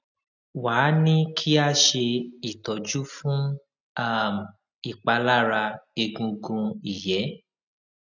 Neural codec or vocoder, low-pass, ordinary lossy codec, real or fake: none; 7.2 kHz; none; real